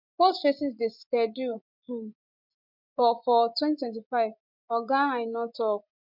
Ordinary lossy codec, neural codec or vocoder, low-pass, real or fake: none; vocoder, 24 kHz, 100 mel bands, Vocos; 5.4 kHz; fake